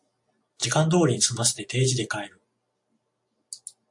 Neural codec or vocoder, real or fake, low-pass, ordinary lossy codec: none; real; 10.8 kHz; AAC, 64 kbps